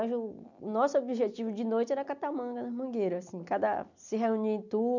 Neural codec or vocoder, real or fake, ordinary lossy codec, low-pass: none; real; none; 7.2 kHz